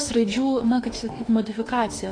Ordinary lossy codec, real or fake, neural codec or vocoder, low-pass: AAC, 32 kbps; fake; autoencoder, 48 kHz, 32 numbers a frame, DAC-VAE, trained on Japanese speech; 9.9 kHz